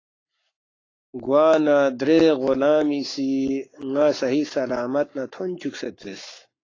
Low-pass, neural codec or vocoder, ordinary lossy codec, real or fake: 7.2 kHz; codec, 44.1 kHz, 7.8 kbps, Pupu-Codec; AAC, 32 kbps; fake